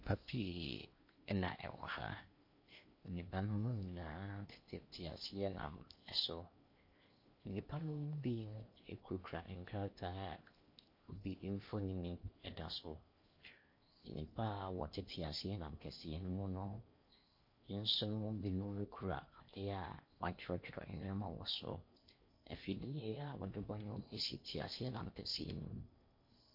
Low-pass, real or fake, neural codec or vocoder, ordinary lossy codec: 5.4 kHz; fake; codec, 16 kHz in and 24 kHz out, 0.8 kbps, FocalCodec, streaming, 65536 codes; MP3, 32 kbps